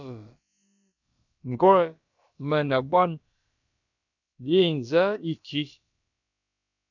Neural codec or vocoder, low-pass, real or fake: codec, 16 kHz, about 1 kbps, DyCAST, with the encoder's durations; 7.2 kHz; fake